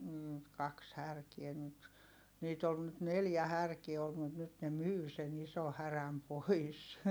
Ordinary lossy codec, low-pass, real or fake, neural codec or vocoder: none; none; real; none